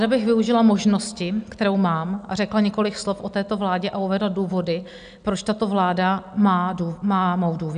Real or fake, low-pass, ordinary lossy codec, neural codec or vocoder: real; 9.9 kHz; MP3, 96 kbps; none